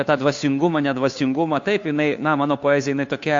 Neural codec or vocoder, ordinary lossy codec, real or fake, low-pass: codec, 16 kHz, 2 kbps, FunCodec, trained on Chinese and English, 25 frames a second; AAC, 48 kbps; fake; 7.2 kHz